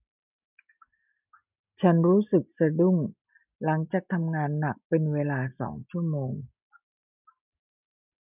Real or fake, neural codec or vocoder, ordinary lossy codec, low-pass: real; none; none; 3.6 kHz